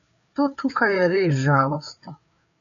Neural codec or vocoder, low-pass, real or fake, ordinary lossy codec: codec, 16 kHz, 4 kbps, FreqCodec, larger model; 7.2 kHz; fake; MP3, 96 kbps